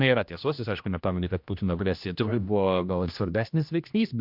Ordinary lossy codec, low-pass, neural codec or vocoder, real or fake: MP3, 48 kbps; 5.4 kHz; codec, 16 kHz, 1 kbps, X-Codec, HuBERT features, trained on general audio; fake